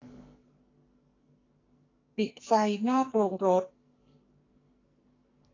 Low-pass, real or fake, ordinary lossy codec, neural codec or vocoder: 7.2 kHz; fake; AAC, 32 kbps; codec, 44.1 kHz, 2.6 kbps, SNAC